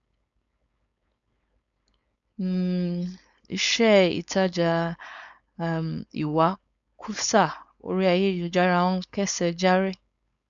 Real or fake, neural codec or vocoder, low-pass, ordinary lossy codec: fake; codec, 16 kHz, 4.8 kbps, FACodec; 7.2 kHz; none